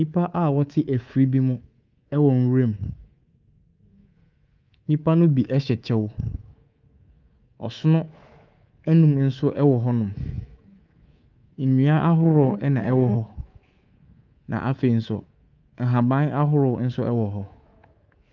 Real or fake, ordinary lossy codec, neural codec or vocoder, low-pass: fake; Opus, 24 kbps; codec, 24 kHz, 3.1 kbps, DualCodec; 7.2 kHz